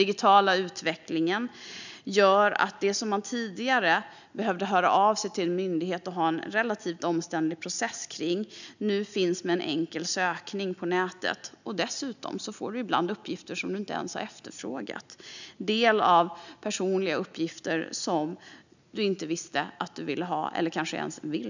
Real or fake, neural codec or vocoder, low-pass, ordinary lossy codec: real; none; 7.2 kHz; none